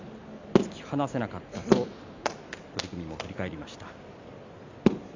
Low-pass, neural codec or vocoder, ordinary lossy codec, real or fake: 7.2 kHz; none; MP3, 64 kbps; real